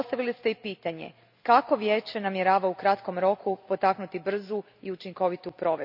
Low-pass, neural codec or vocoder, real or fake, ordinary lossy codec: 5.4 kHz; none; real; none